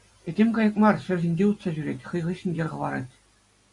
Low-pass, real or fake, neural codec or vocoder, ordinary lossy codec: 10.8 kHz; real; none; AAC, 48 kbps